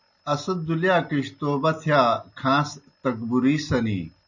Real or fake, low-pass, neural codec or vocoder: real; 7.2 kHz; none